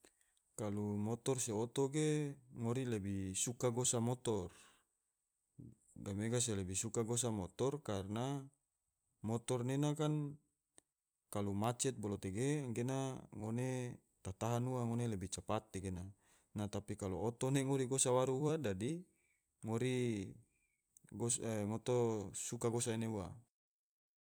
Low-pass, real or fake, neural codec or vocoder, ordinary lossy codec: none; fake; vocoder, 44.1 kHz, 128 mel bands every 256 samples, BigVGAN v2; none